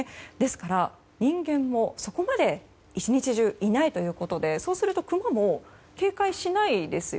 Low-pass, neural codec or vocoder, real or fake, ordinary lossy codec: none; none; real; none